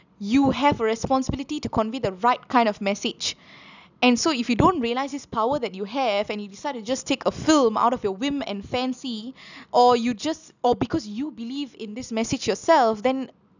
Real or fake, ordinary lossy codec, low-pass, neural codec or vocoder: real; none; 7.2 kHz; none